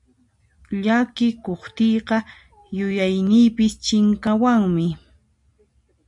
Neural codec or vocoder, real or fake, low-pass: none; real; 10.8 kHz